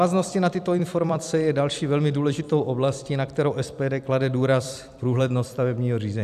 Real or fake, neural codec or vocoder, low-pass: fake; vocoder, 44.1 kHz, 128 mel bands every 256 samples, BigVGAN v2; 14.4 kHz